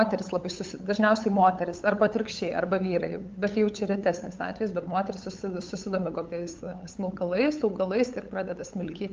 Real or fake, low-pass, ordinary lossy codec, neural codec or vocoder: fake; 7.2 kHz; Opus, 24 kbps; codec, 16 kHz, 8 kbps, FunCodec, trained on LibriTTS, 25 frames a second